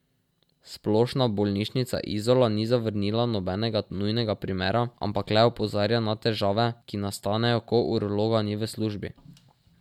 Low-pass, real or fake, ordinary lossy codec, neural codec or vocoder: 19.8 kHz; real; MP3, 96 kbps; none